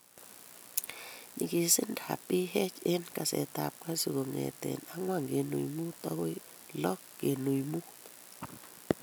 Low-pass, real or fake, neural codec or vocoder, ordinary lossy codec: none; real; none; none